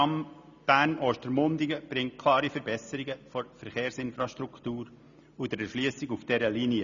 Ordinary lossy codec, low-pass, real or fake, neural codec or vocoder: none; 7.2 kHz; real; none